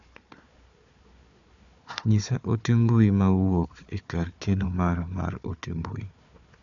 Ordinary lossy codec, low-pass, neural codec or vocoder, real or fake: none; 7.2 kHz; codec, 16 kHz, 4 kbps, FunCodec, trained on Chinese and English, 50 frames a second; fake